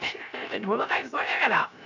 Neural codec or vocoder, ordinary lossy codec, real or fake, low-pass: codec, 16 kHz, 0.3 kbps, FocalCodec; none; fake; 7.2 kHz